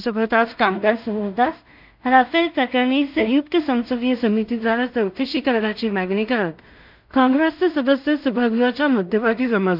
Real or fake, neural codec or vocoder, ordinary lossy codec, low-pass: fake; codec, 16 kHz in and 24 kHz out, 0.4 kbps, LongCat-Audio-Codec, two codebook decoder; none; 5.4 kHz